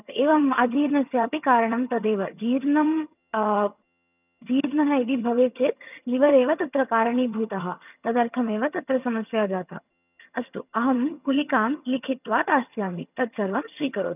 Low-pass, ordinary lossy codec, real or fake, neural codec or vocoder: 3.6 kHz; none; fake; vocoder, 22.05 kHz, 80 mel bands, HiFi-GAN